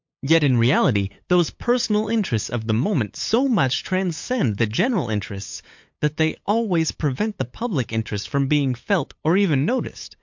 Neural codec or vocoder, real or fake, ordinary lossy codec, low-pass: codec, 16 kHz, 8 kbps, FunCodec, trained on LibriTTS, 25 frames a second; fake; MP3, 48 kbps; 7.2 kHz